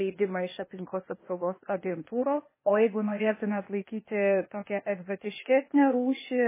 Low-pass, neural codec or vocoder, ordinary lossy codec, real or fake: 3.6 kHz; codec, 16 kHz, 0.8 kbps, ZipCodec; MP3, 16 kbps; fake